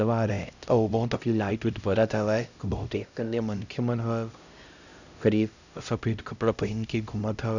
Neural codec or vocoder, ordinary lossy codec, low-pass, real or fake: codec, 16 kHz, 0.5 kbps, X-Codec, HuBERT features, trained on LibriSpeech; none; 7.2 kHz; fake